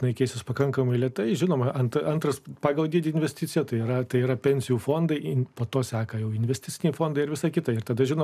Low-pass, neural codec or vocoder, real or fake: 14.4 kHz; none; real